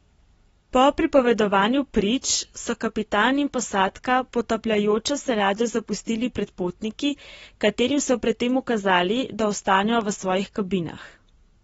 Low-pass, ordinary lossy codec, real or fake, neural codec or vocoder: 19.8 kHz; AAC, 24 kbps; real; none